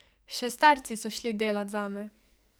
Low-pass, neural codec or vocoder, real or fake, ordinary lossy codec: none; codec, 44.1 kHz, 2.6 kbps, SNAC; fake; none